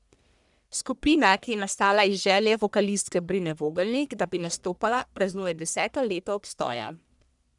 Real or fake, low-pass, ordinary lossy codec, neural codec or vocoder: fake; 10.8 kHz; none; codec, 44.1 kHz, 1.7 kbps, Pupu-Codec